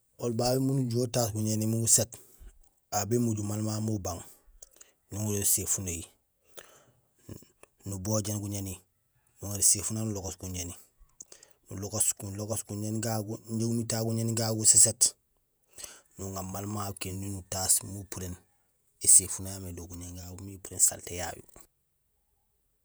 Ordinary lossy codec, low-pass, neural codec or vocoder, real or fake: none; none; vocoder, 48 kHz, 128 mel bands, Vocos; fake